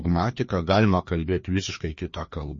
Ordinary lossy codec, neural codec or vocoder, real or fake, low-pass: MP3, 32 kbps; codec, 16 kHz, 2 kbps, FreqCodec, larger model; fake; 7.2 kHz